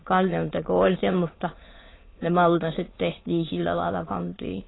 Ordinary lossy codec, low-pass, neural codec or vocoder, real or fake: AAC, 16 kbps; 7.2 kHz; autoencoder, 22.05 kHz, a latent of 192 numbers a frame, VITS, trained on many speakers; fake